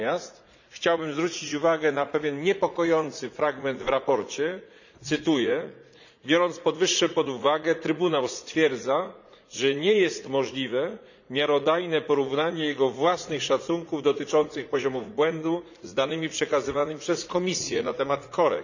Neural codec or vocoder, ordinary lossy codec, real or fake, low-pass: vocoder, 44.1 kHz, 80 mel bands, Vocos; none; fake; 7.2 kHz